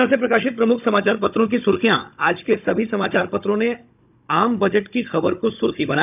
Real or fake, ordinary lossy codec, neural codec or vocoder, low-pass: fake; none; codec, 16 kHz, 16 kbps, FunCodec, trained on Chinese and English, 50 frames a second; 3.6 kHz